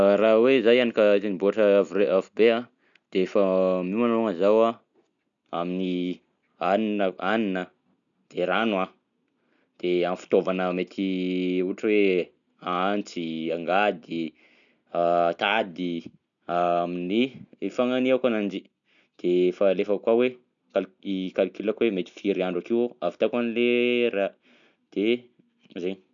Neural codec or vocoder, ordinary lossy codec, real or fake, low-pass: none; none; real; 7.2 kHz